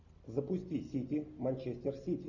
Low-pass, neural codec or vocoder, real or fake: 7.2 kHz; none; real